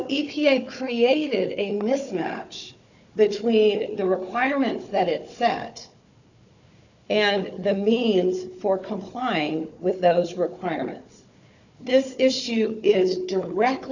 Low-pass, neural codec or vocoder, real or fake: 7.2 kHz; codec, 16 kHz, 4 kbps, FunCodec, trained on Chinese and English, 50 frames a second; fake